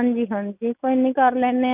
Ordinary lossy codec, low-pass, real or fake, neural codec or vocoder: none; 3.6 kHz; real; none